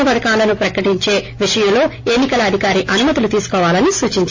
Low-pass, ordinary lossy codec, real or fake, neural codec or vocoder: 7.2 kHz; AAC, 48 kbps; real; none